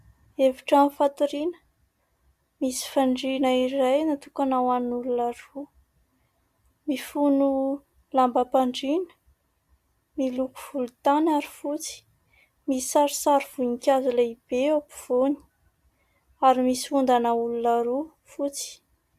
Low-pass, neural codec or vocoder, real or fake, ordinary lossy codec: 14.4 kHz; none; real; Opus, 64 kbps